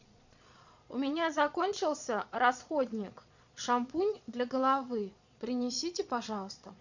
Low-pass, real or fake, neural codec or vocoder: 7.2 kHz; fake; vocoder, 22.05 kHz, 80 mel bands, Vocos